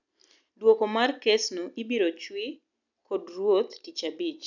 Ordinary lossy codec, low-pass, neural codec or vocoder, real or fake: none; 7.2 kHz; none; real